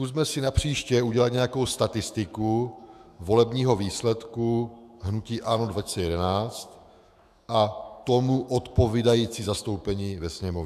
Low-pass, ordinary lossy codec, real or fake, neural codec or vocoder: 14.4 kHz; AAC, 96 kbps; fake; autoencoder, 48 kHz, 128 numbers a frame, DAC-VAE, trained on Japanese speech